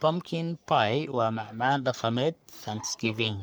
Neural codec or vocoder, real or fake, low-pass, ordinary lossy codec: codec, 44.1 kHz, 3.4 kbps, Pupu-Codec; fake; none; none